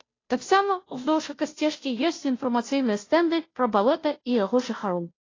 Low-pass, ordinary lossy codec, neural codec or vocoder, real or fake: 7.2 kHz; AAC, 32 kbps; codec, 16 kHz, 0.5 kbps, FunCodec, trained on Chinese and English, 25 frames a second; fake